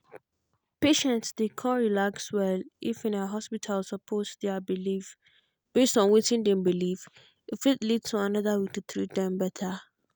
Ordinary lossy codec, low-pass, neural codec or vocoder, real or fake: none; none; none; real